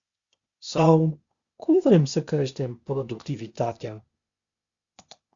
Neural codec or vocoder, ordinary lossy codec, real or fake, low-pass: codec, 16 kHz, 0.8 kbps, ZipCodec; Opus, 64 kbps; fake; 7.2 kHz